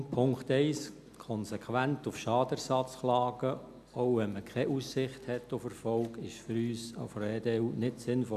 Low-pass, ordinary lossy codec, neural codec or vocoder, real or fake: 14.4 kHz; none; none; real